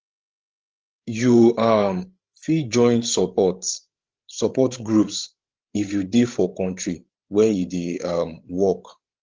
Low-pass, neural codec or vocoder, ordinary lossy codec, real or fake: 7.2 kHz; codec, 16 kHz, 16 kbps, FreqCodec, larger model; Opus, 16 kbps; fake